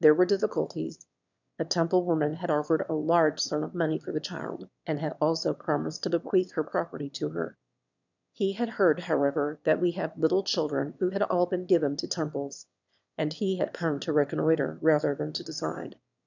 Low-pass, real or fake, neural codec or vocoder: 7.2 kHz; fake; autoencoder, 22.05 kHz, a latent of 192 numbers a frame, VITS, trained on one speaker